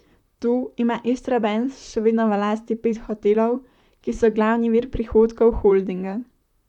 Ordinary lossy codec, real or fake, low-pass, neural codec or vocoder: none; fake; 19.8 kHz; vocoder, 44.1 kHz, 128 mel bands, Pupu-Vocoder